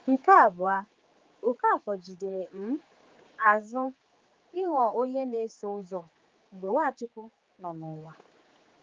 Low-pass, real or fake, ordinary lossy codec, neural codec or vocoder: 7.2 kHz; fake; Opus, 32 kbps; codec, 16 kHz, 4 kbps, X-Codec, HuBERT features, trained on general audio